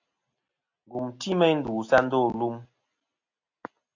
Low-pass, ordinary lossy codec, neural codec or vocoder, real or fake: 7.2 kHz; AAC, 48 kbps; none; real